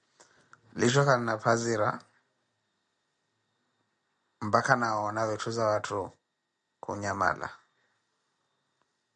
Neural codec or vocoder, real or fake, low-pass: none; real; 9.9 kHz